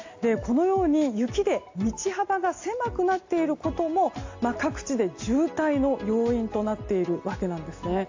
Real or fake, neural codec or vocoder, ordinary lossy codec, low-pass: real; none; AAC, 48 kbps; 7.2 kHz